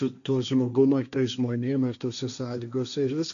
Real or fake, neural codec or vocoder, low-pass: fake; codec, 16 kHz, 1.1 kbps, Voila-Tokenizer; 7.2 kHz